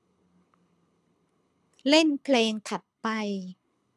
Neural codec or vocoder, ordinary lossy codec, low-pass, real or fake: codec, 24 kHz, 6 kbps, HILCodec; none; none; fake